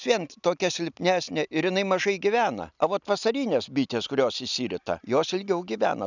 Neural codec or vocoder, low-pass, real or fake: none; 7.2 kHz; real